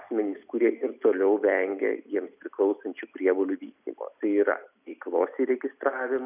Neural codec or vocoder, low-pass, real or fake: none; 3.6 kHz; real